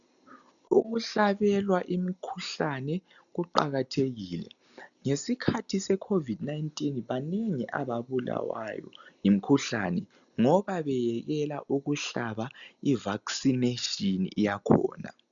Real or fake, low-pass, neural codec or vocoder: real; 7.2 kHz; none